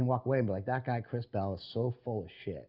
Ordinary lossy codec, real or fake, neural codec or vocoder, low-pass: Opus, 64 kbps; real; none; 5.4 kHz